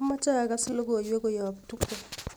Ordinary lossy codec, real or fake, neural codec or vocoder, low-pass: none; real; none; none